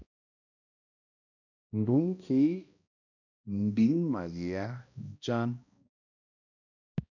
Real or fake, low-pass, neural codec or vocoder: fake; 7.2 kHz; codec, 16 kHz, 1 kbps, X-Codec, HuBERT features, trained on balanced general audio